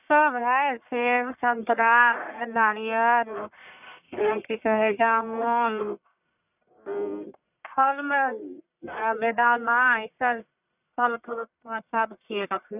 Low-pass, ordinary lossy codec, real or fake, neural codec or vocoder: 3.6 kHz; none; fake; codec, 44.1 kHz, 1.7 kbps, Pupu-Codec